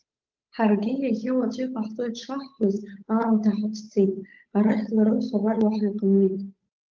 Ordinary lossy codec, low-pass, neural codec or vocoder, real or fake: Opus, 32 kbps; 7.2 kHz; codec, 16 kHz, 8 kbps, FunCodec, trained on Chinese and English, 25 frames a second; fake